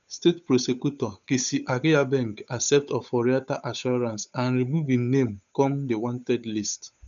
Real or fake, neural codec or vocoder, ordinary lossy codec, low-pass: fake; codec, 16 kHz, 8 kbps, FunCodec, trained on Chinese and English, 25 frames a second; none; 7.2 kHz